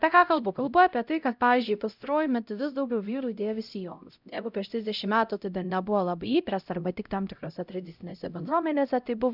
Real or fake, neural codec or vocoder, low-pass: fake; codec, 16 kHz, 0.5 kbps, X-Codec, HuBERT features, trained on LibriSpeech; 5.4 kHz